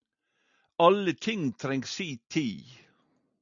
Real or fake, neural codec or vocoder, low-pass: real; none; 7.2 kHz